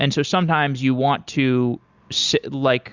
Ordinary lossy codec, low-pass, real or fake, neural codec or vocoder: Opus, 64 kbps; 7.2 kHz; real; none